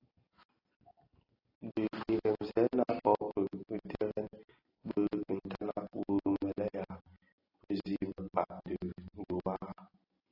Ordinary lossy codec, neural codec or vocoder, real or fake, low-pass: AAC, 24 kbps; none; real; 5.4 kHz